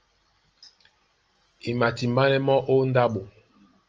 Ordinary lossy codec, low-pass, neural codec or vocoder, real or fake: Opus, 24 kbps; 7.2 kHz; none; real